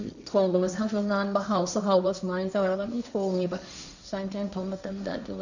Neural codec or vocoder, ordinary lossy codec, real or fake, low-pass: codec, 16 kHz, 1.1 kbps, Voila-Tokenizer; none; fake; 7.2 kHz